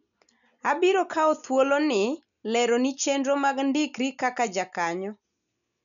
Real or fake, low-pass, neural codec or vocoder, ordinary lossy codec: real; 7.2 kHz; none; none